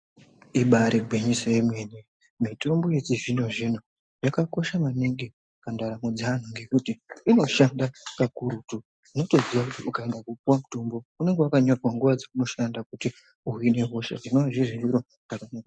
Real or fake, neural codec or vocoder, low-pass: real; none; 9.9 kHz